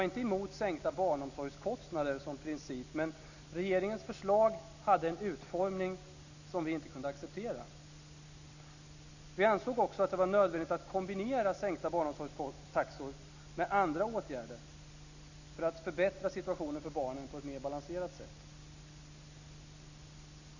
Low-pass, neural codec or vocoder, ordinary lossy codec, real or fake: 7.2 kHz; none; none; real